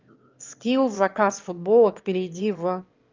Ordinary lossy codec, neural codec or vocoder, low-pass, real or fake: Opus, 24 kbps; autoencoder, 22.05 kHz, a latent of 192 numbers a frame, VITS, trained on one speaker; 7.2 kHz; fake